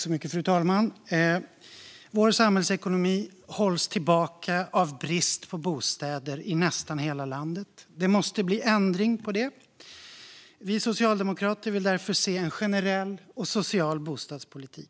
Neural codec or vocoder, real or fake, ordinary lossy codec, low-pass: none; real; none; none